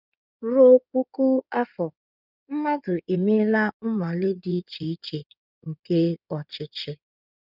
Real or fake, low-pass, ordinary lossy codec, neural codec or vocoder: fake; 5.4 kHz; none; codec, 16 kHz in and 24 kHz out, 2.2 kbps, FireRedTTS-2 codec